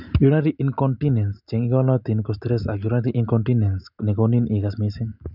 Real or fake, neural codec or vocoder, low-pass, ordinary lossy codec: real; none; 5.4 kHz; none